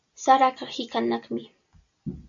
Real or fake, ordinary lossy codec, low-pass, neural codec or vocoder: real; AAC, 64 kbps; 7.2 kHz; none